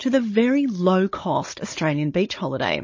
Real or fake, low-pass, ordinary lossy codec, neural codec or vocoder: fake; 7.2 kHz; MP3, 32 kbps; codec, 16 kHz, 16 kbps, FunCodec, trained on Chinese and English, 50 frames a second